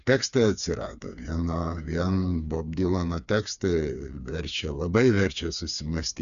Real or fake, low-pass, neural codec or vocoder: fake; 7.2 kHz; codec, 16 kHz, 4 kbps, FreqCodec, smaller model